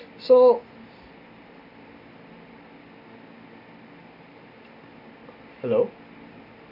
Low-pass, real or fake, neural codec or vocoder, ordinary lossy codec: 5.4 kHz; real; none; none